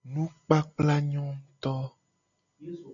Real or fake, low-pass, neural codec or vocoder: real; 7.2 kHz; none